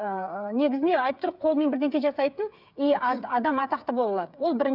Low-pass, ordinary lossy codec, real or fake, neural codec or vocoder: 5.4 kHz; none; fake; codec, 16 kHz, 8 kbps, FreqCodec, smaller model